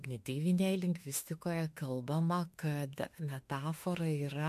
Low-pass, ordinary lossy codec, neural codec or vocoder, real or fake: 14.4 kHz; MP3, 64 kbps; autoencoder, 48 kHz, 32 numbers a frame, DAC-VAE, trained on Japanese speech; fake